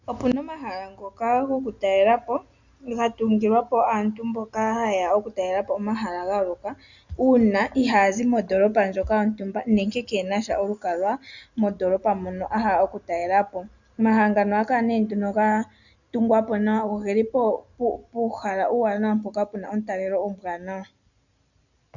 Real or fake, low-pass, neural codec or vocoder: real; 7.2 kHz; none